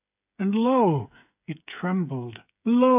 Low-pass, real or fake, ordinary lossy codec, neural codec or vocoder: 3.6 kHz; fake; AAC, 32 kbps; codec, 16 kHz, 8 kbps, FreqCodec, smaller model